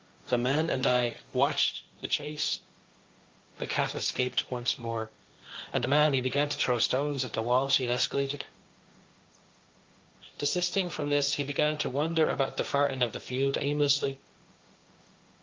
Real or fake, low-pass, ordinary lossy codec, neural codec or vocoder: fake; 7.2 kHz; Opus, 32 kbps; codec, 16 kHz, 1.1 kbps, Voila-Tokenizer